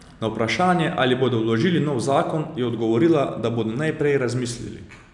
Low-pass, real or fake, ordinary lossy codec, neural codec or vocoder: 10.8 kHz; real; none; none